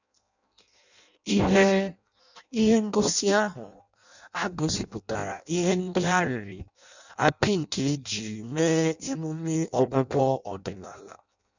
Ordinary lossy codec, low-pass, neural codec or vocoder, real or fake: none; 7.2 kHz; codec, 16 kHz in and 24 kHz out, 0.6 kbps, FireRedTTS-2 codec; fake